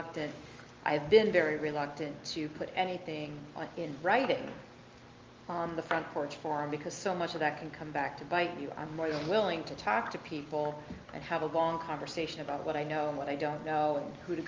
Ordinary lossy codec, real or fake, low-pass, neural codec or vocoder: Opus, 32 kbps; real; 7.2 kHz; none